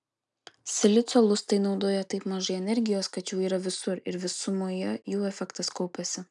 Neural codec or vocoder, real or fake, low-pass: none; real; 10.8 kHz